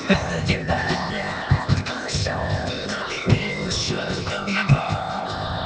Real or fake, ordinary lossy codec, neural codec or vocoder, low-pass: fake; none; codec, 16 kHz, 0.8 kbps, ZipCodec; none